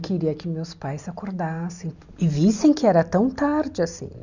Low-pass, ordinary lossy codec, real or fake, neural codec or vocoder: 7.2 kHz; none; real; none